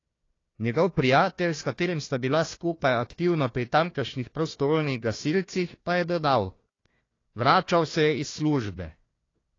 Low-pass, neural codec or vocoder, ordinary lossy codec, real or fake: 7.2 kHz; codec, 16 kHz, 1 kbps, FunCodec, trained on Chinese and English, 50 frames a second; AAC, 32 kbps; fake